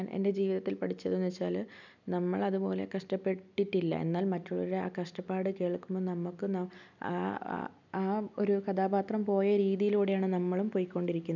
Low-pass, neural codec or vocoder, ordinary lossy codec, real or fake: 7.2 kHz; none; none; real